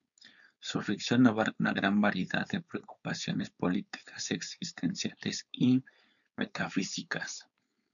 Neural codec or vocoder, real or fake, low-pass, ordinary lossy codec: codec, 16 kHz, 4.8 kbps, FACodec; fake; 7.2 kHz; MP3, 96 kbps